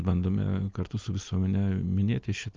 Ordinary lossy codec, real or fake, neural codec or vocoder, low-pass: Opus, 32 kbps; real; none; 7.2 kHz